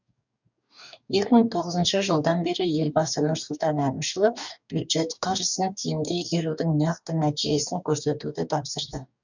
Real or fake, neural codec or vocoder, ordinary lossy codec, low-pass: fake; codec, 44.1 kHz, 2.6 kbps, DAC; none; 7.2 kHz